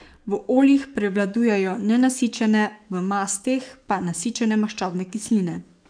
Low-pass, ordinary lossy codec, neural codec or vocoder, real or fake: 9.9 kHz; none; codec, 44.1 kHz, 7.8 kbps, DAC; fake